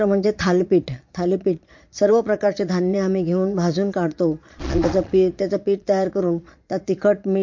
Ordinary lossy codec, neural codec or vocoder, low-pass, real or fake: MP3, 48 kbps; vocoder, 22.05 kHz, 80 mel bands, Vocos; 7.2 kHz; fake